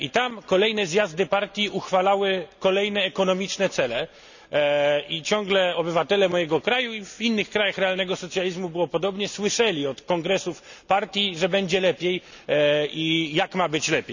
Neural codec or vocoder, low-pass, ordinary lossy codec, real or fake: none; 7.2 kHz; none; real